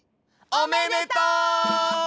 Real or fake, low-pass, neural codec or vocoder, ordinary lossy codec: real; none; none; none